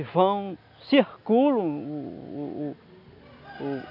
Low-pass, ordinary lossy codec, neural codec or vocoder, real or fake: 5.4 kHz; none; none; real